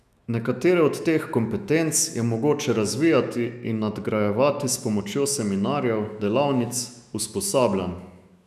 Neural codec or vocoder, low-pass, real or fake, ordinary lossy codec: autoencoder, 48 kHz, 128 numbers a frame, DAC-VAE, trained on Japanese speech; 14.4 kHz; fake; none